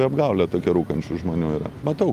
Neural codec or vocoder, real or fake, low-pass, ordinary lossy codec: none; real; 14.4 kHz; Opus, 32 kbps